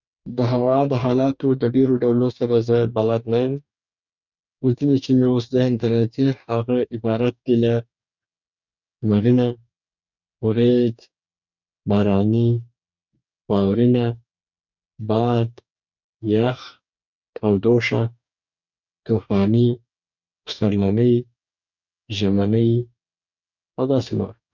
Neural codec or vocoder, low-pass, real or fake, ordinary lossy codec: codec, 44.1 kHz, 2.6 kbps, DAC; 7.2 kHz; fake; none